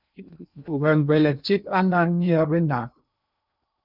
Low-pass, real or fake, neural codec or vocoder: 5.4 kHz; fake; codec, 16 kHz in and 24 kHz out, 0.8 kbps, FocalCodec, streaming, 65536 codes